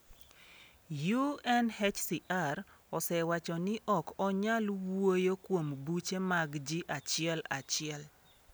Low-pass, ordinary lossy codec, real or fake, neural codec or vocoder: none; none; real; none